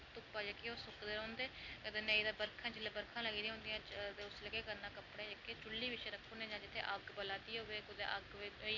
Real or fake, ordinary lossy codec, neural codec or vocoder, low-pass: real; none; none; 7.2 kHz